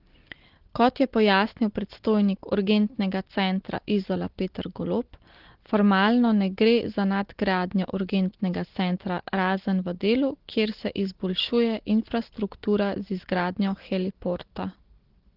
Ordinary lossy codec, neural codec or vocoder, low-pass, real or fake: Opus, 16 kbps; none; 5.4 kHz; real